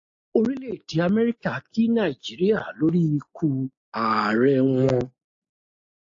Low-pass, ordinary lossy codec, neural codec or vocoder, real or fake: 7.2 kHz; AAC, 48 kbps; none; real